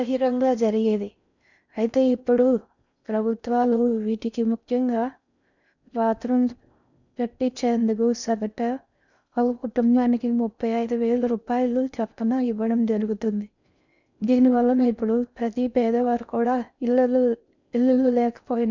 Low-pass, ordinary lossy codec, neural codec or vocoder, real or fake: 7.2 kHz; none; codec, 16 kHz in and 24 kHz out, 0.6 kbps, FocalCodec, streaming, 4096 codes; fake